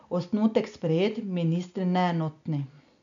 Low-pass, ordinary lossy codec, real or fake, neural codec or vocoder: 7.2 kHz; none; real; none